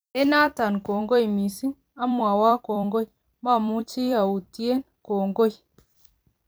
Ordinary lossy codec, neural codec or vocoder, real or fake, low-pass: none; vocoder, 44.1 kHz, 128 mel bands every 256 samples, BigVGAN v2; fake; none